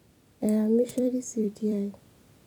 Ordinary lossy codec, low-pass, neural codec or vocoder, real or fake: none; 19.8 kHz; vocoder, 44.1 kHz, 128 mel bands every 256 samples, BigVGAN v2; fake